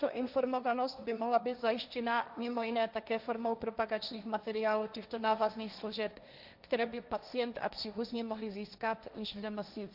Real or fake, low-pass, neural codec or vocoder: fake; 5.4 kHz; codec, 16 kHz, 1.1 kbps, Voila-Tokenizer